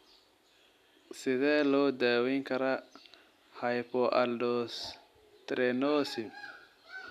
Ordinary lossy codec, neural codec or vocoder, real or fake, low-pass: none; none; real; 14.4 kHz